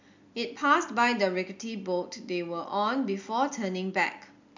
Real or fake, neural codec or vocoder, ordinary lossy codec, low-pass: real; none; MP3, 64 kbps; 7.2 kHz